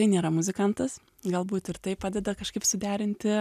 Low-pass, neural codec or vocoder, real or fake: 14.4 kHz; none; real